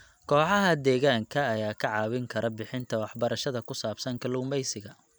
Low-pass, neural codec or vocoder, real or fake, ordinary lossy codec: none; none; real; none